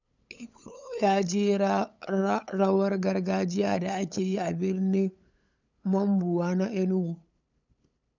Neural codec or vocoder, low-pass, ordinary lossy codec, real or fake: codec, 16 kHz, 8 kbps, FunCodec, trained on LibriTTS, 25 frames a second; 7.2 kHz; none; fake